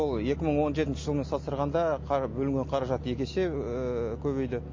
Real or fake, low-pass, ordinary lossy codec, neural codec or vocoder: real; 7.2 kHz; MP3, 32 kbps; none